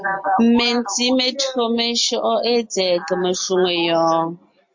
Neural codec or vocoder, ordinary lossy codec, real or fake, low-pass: none; MP3, 48 kbps; real; 7.2 kHz